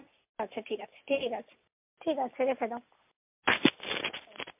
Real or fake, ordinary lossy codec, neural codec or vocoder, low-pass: real; MP3, 32 kbps; none; 3.6 kHz